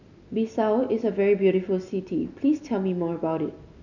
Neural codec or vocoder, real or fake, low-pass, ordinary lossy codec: none; real; 7.2 kHz; none